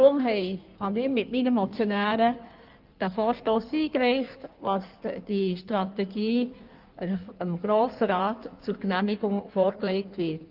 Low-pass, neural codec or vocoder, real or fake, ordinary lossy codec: 5.4 kHz; codec, 16 kHz in and 24 kHz out, 1.1 kbps, FireRedTTS-2 codec; fake; Opus, 24 kbps